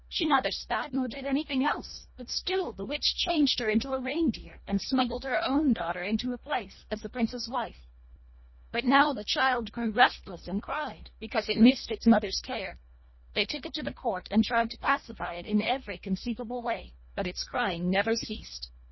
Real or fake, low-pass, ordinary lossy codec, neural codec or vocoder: fake; 7.2 kHz; MP3, 24 kbps; codec, 24 kHz, 1.5 kbps, HILCodec